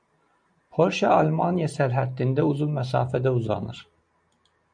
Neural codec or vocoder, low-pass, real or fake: none; 9.9 kHz; real